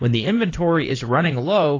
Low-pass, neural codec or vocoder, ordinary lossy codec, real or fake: 7.2 kHz; codec, 16 kHz in and 24 kHz out, 2.2 kbps, FireRedTTS-2 codec; AAC, 32 kbps; fake